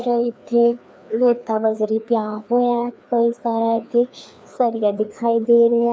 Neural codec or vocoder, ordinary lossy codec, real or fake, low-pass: codec, 16 kHz, 2 kbps, FreqCodec, larger model; none; fake; none